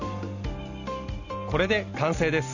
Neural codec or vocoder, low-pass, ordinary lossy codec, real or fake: none; 7.2 kHz; Opus, 64 kbps; real